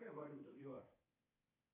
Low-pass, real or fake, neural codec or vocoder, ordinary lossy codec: 3.6 kHz; fake; codec, 24 kHz, 3.1 kbps, DualCodec; AAC, 24 kbps